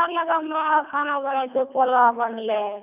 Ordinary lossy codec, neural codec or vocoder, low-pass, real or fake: none; codec, 24 kHz, 1.5 kbps, HILCodec; 3.6 kHz; fake